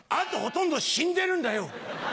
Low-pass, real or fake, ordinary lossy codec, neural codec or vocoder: none; real; none; none